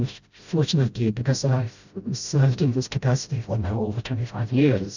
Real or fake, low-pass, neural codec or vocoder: fake; 7.2 kHz; codec, 16 kHz, 0.5 kbps, FreqCodec, smaller model